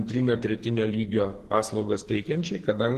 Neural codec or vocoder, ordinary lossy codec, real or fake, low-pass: codec, 44.1 kHz, 2.6 kbps, SNAC; Opus, 16 kbps; fake; 14.4 kHz